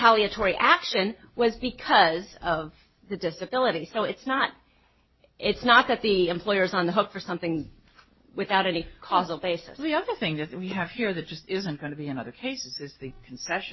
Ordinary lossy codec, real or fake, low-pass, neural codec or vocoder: MP3, 24 kbps; real; 7.2 kHz; none